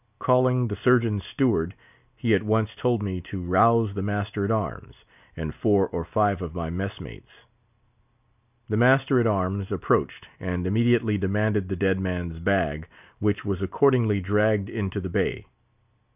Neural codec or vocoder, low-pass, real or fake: none; 3.6 kHz; real